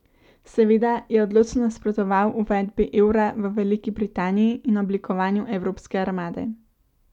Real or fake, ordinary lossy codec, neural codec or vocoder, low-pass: real; none; none; 19.8 kHz